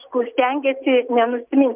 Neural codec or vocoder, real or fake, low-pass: none; real; 3.6 kHz